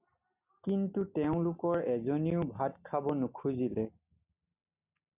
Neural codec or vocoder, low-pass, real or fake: none; 3.6 kHz; real